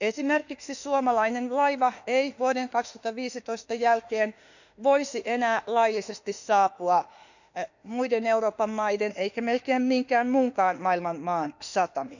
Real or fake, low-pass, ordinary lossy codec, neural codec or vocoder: fake; 7.2 kHz; none; autoencoder, 48 kHz, 32 numbers a frame, DAC-VAE, trained on Japanese speech